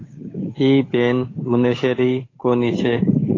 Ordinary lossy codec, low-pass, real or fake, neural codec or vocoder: AAC, 32 kbps; 7.2 kHz; fake; codec, 16 kHz, 8 kbps, FunCodec, trained on Chinese and English, 25 frames a second